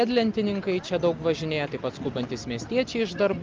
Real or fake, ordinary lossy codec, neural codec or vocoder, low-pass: real; Opus, 32 kbps; none; 7.2 kHz